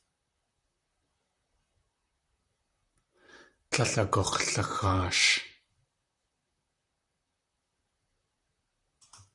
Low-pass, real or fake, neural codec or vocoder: 10.8 kHz; fake; vocoder, 44.1 kHz, 128 mel bands, Pupu-Vocoder